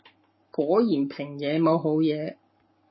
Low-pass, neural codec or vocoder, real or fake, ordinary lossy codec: 7.2 kHz; codec, 44.1 kHz, 7.8 kbps, Pupu-Codec; fake; MP3, 24 kbps